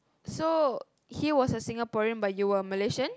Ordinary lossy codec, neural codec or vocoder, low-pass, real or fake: none; none; none; real